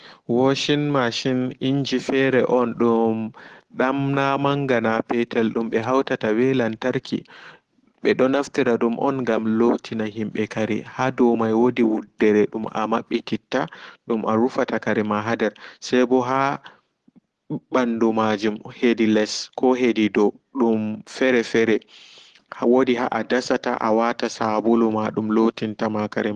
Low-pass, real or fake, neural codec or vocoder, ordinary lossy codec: 10.8 kHz; real; none; Opus, 16 kbps